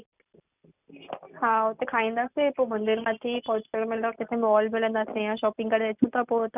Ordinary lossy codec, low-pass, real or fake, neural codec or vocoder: none; 3.6 kHz; real; none